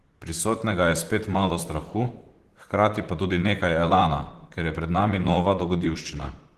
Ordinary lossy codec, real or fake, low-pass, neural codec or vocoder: Opus, 16 kbps; fake; 14.4 kHz; vocoder, 44.1 kHz, 128 mel bands, Pupu-Vocoder